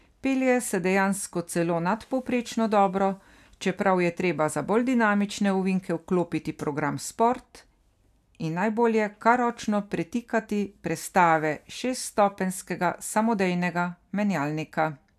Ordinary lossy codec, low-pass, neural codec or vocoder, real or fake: none; 14.4 kHz; none; real